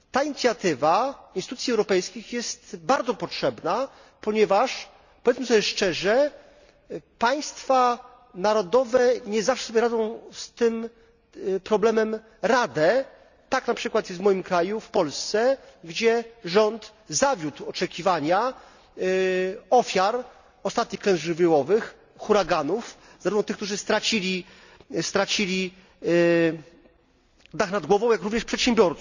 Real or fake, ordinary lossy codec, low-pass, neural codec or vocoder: real; none; 7.2 kHz; none